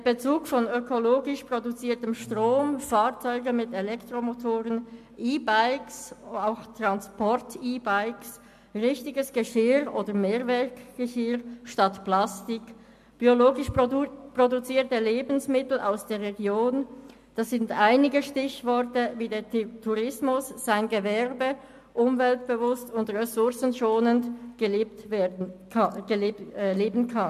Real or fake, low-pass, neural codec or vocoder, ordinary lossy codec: real; 14.4 kHz; none; MP3, 64 kbps